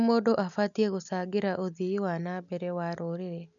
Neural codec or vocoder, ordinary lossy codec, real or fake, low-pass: none; none; real; 7.2 kHz